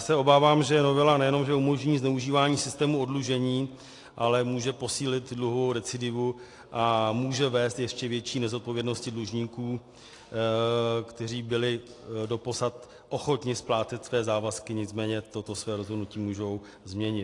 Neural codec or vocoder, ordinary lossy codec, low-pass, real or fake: none; AAC, 48 kbps; 10.8 kHz; real